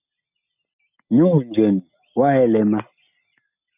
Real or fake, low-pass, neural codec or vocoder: real; 3.6 kHz; none